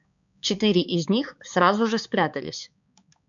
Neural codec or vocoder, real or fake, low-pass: codec, 16 kHz, 4 kbps, X-Codec, HuBERT features, trained on balanced general audio; fake; 7.2 kHz